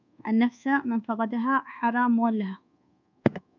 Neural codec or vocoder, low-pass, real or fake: codec, 24 kHz, 1.2 kbps, DualCodec; 7.2 kHz; fake